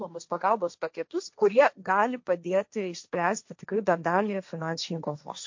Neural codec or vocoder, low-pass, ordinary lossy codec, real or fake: codec, 16 kHz, 1.1 kbps, Voila-Tokenizer; 7.2 kHz; MP3, 48 kbps; fake